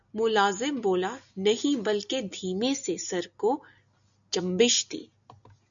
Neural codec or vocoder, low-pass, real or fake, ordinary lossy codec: none; 7.2 kHz; real; MP3, 96 kbps